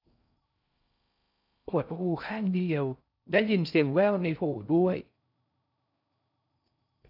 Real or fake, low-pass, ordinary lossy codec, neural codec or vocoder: fake; 5.4 kHz; none; codec, 16 kHz in and 24 kHz out, 0.6 kbps, FocalCodec, streaming, 4096 codes